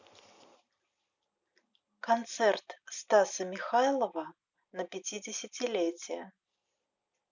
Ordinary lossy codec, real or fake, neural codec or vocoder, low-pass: none; real; none; 7.2 kHz